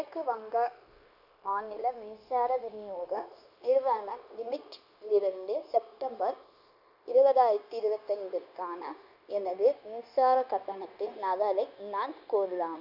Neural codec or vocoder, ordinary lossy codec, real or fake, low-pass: codec, 16 kHz, 0.9 kbps, LongCat-Audio-Codec; none; fake; 5.4 kHz